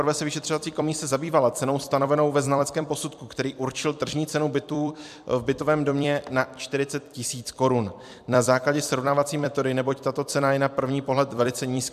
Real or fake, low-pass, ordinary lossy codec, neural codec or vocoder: fake; 14.4 kHz; MP3, 96 kbps; vocoder, 44.1 kHz, 128 mel bands every 256 samples, BigVGAN v2